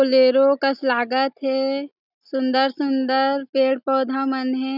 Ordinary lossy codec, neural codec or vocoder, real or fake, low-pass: none; none; real; 5.4 kHz